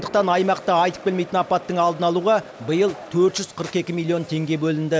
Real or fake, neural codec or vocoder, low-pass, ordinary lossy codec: real; none; none; none